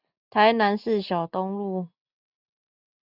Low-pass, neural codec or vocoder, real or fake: 5.4 kHz; none; real